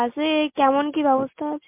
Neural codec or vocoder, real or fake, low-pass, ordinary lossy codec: none; real; 3.6 kHz; MP3, 32 kbps